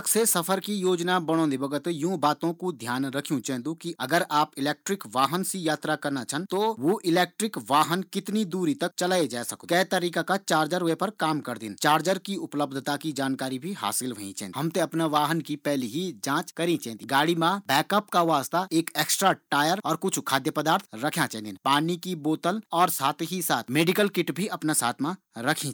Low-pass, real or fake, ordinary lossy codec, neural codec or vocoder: none; real; none; none